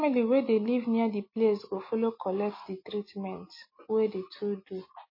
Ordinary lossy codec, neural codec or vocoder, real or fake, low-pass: MP3, 24 kbps; none; real; 5.4 kHz